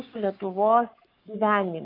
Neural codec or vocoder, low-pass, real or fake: codec, 44.1 kHz, 7.8 kbps, Pupu-Codec; 5.4 kHz; fake